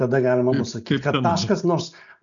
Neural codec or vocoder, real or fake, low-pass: none; real; 7.2 kHz